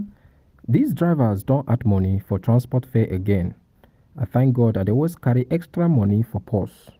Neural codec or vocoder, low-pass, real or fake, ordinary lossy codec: none; 14.4 kHz; real; Opus, 24 kbps